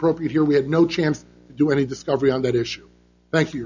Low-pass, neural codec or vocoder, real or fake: 7.2 kHz; none; real